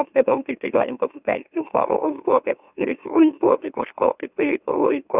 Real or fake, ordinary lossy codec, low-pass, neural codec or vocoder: fake; Opus, 64 kbps; 3.6 kHz; autoencoder, 44.1 kHz, a latent of 192 numbers a frame, MeloTTS